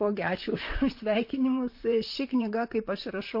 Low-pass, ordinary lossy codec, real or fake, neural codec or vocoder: 5.4 kHz; MP3, 32 kbps; fake; vocoder, 44.1 kHz, 128 mel bands, Pupu-Vocoder